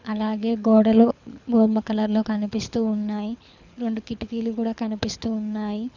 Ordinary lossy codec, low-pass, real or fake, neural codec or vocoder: none; 7.2 kHz; fake; codec, 24 kHz, 6 kbps, HILCodec